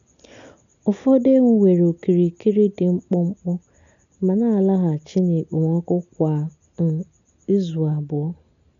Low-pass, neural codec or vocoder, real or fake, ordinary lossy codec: 7.2 kHz; none; real; none